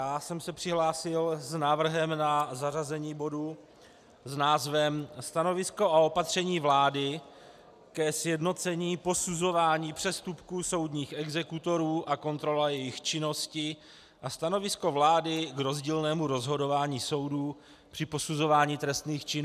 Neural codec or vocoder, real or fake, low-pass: none; real; 14.4 kHz